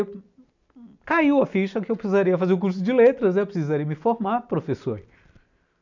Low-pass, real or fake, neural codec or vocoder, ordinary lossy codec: 7.2 kHz; real; none; none